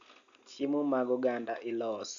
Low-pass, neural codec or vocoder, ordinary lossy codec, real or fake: 7.2 kHz; none; none; real